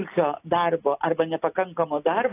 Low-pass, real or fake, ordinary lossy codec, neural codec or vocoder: 3.6 kHz; real; AAC, 24 kbps; none